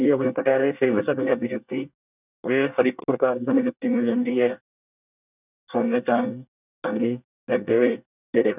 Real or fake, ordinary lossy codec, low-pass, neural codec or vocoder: fake; none; 3.6 kHz; codec, 24 kHz, 1 kbps, SNAC